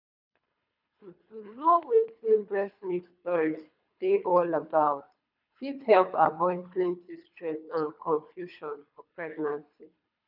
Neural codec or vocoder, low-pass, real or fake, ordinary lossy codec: codec, 24 kHz, 3 kbps, HILCodec; 5.4 kHz; fake; none